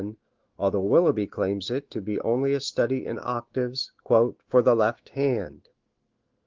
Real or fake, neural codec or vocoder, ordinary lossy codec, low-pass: fake; autoencoder, 48 kHz, 128 numbers a frame, DAC-VAE, trained on Japanese speech; Opus, 16 kbps; 7.2 kHz